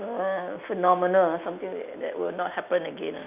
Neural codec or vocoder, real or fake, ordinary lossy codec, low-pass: none; real; none; 3.6 kHz